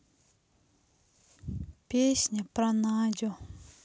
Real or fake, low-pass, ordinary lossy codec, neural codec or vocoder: real; none; none; none